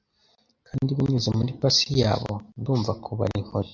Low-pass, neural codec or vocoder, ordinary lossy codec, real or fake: 7.2 kHz; none; MP3, 64 kbps; real